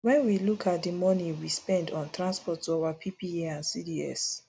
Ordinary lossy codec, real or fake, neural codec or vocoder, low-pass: none; real; none; none